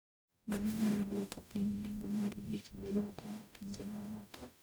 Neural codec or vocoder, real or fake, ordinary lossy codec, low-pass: codec, 44.1 kHz, 0.9 kbps, DAC; fake; none; none